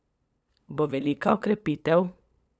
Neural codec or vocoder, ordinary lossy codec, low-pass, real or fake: codec, 16 kHz, 8 kbps, FunCodec, trained on LibriTTS, 25 frames a second; none; none; fake